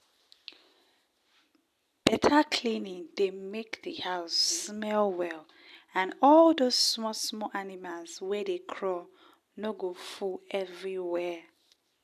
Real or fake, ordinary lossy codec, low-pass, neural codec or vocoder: real; none; 14.4 kHz; none